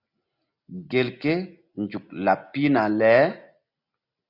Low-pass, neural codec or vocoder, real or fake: 5.4 kHz; none; real